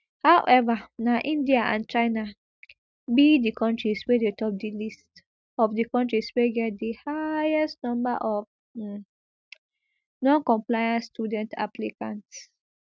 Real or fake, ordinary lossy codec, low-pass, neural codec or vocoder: real; none; none; none